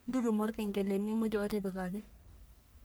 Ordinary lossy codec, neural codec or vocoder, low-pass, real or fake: none; codec, 44.1 kHz, 1.7 kbps, Pupu-Codec; none; fake